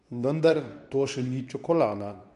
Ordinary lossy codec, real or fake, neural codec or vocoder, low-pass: MP3, 64 kbps; fake; codec, 24 kHz, 0.9 kbps, WavTokenizer, medium speech release version 2; 10.8 kHz